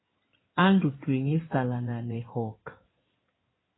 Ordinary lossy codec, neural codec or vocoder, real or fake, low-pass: AAC, 16 kbps; codec, 16 kHz in and 24 kHz out, 2.2 kbps, FireRedTTS-2 codec; fake; 7.2 kHz